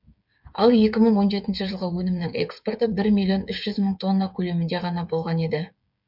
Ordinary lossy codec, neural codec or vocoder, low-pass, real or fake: none; codec, 16 kHz, 8 kbps, FreqCodec, smaller model; 5.4 kHz; fake